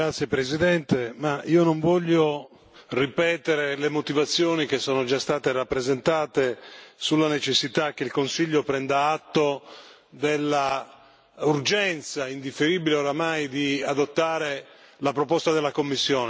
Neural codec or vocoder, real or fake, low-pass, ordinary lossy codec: none; real; none; none